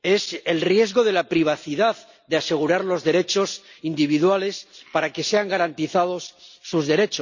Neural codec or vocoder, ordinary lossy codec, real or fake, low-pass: none; none; real; 7.2 kHz